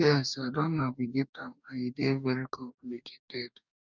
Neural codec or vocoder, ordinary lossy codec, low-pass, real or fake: codec, 44.1 kHz, 2.6 kbps, DAC; none; 7.2 kHz; fake